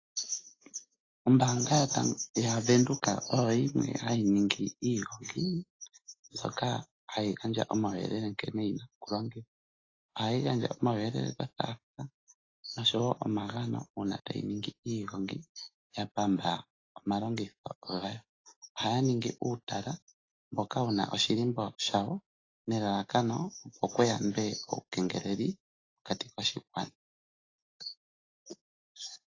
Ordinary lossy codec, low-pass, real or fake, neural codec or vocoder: AAC, 32 kbps; 7.2 kHz; real; none